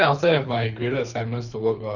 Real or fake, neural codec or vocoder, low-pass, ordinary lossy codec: fake; codec, 24 kHz, 3 kbps, HILCodec; 7.2 kHz; none